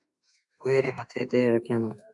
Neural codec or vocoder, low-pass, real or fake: autoencoder, 48 kHz, 32 numbers a frame, DAC-VAE, trained on Japanese speech; 10.8 kHz; fake